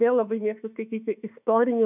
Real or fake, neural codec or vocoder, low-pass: fake; autoencoder, 48 kHz, 32 numbers a frame, DAC-VAE, trained on Japanese speech; 3.6 kHz